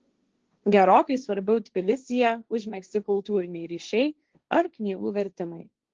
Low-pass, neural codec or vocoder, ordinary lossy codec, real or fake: 7.2 kHz; codec, 16 kHz, 1.1 kbps, Voila-Tokenizer; Opus, 16 kbps; fake